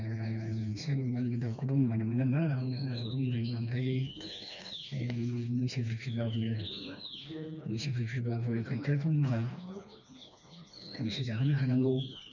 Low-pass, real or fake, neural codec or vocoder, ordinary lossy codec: 7.2 kHz; fake; codec, 16 kHz, 2 kbps, FreqCodec, smaller model; none